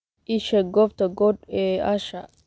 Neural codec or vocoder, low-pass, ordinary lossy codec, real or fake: none; none; none; real